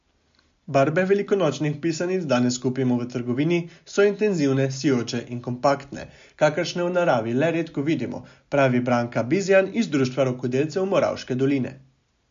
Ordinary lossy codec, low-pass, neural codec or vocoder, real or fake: MP3, 48 kbps; 7.2 kHz; none; real